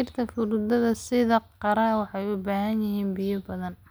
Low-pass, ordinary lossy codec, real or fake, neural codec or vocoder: none; none; real; none